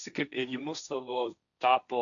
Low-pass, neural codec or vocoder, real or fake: 7.2 kHz; codec, 16 kHz, 1.1 kbps, Voila-Tokenizer; fake